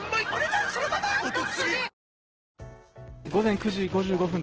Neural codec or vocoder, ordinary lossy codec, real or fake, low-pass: none; Opus, 16 kbps; real; 7.2 kHz